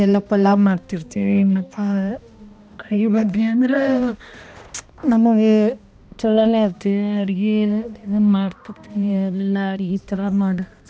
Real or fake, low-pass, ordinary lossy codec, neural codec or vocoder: fake; none; none; codec, 16 kHz, 1 kbps, X-Codec, HuBERT features, trained on balanced general audio